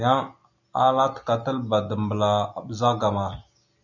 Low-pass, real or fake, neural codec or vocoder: 7.2 kHz; real; none